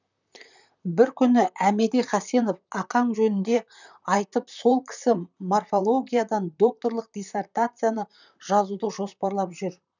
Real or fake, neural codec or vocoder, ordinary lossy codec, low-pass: fake; vocoder, 44.1 kHz, 128 mel bands, Pupu-Vocoder; none; 7.2 kHz